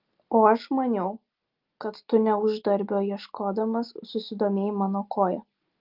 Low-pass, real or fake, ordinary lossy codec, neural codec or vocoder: 5.4 kHz; real; Opus, 32 kbps; none